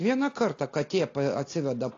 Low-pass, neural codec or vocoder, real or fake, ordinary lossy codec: 7.2 kHz; none; real; AAC, 32 kbps